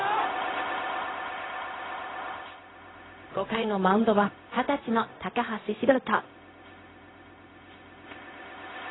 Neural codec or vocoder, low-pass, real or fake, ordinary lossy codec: codec, 16 kHz, 0.4 kbps, LongCat-Audio-Codec; 7.2 kHz; fake; AAC, 16 kbps